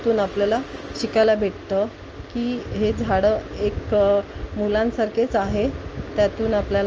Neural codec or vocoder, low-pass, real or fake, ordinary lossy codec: none; 7.2 kHz; real; Opus, 24 kbps